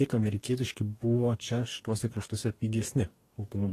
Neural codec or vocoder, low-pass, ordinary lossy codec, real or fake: codec, 44.1 kHz, 2.6 kbps, DAC; 14.4 kHz; AAC, 48 kbps; fake